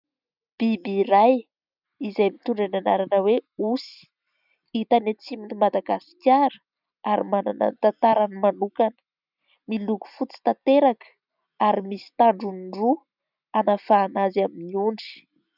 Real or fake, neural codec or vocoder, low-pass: real; none; 5.4 kHz